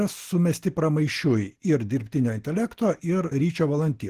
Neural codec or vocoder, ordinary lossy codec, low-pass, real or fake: none; Opus, 16 kbps; 14.4 kHz; real